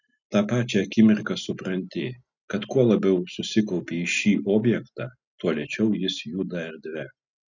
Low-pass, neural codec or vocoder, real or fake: 7.2 kHz; none; real